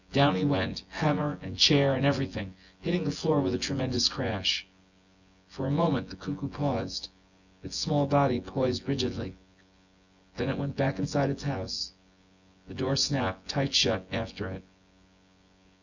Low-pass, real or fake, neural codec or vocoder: 7.2 kHz; fake; vocoder, 24 kHz, 100 mel bands, Vocos